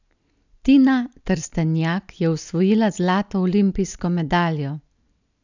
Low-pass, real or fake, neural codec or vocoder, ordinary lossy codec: 7.2 kHz; real; none; none